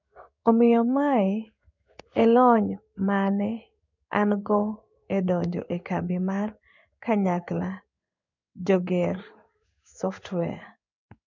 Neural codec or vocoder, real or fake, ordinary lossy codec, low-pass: codec, 16 kHz in and 24 kHz out, 1 kbps, XY-Tokenizer; fake; none; 7.2 kHz